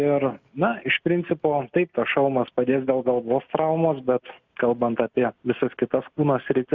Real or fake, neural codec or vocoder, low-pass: real; none; 7.2 kHz